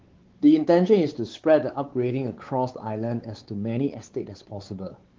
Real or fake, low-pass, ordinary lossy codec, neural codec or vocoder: fake; 7.2 kHz; Opus, 16 kbps; codec, 16 kHz, 4 kbps, X-Codec, WavLM features, trained on Multilingual LibriSpeech